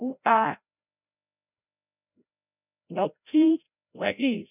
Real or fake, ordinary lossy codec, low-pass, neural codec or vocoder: fake; none; 3.6 kHz; codec, 16 kHz, 0.5 kbps, FreqCodec, larger model